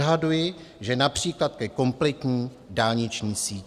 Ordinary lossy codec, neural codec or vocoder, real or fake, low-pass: Opus, 64 kbps; none; real; 14.4 kHz